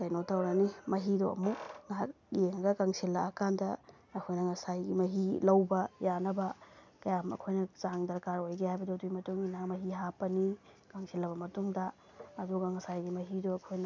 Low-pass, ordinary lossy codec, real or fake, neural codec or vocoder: 7.2 kHz; none; real; none